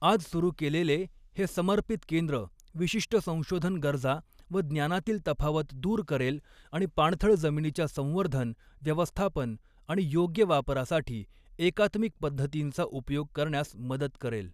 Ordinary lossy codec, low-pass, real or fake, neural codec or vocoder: none; 14.4 kHz; real; none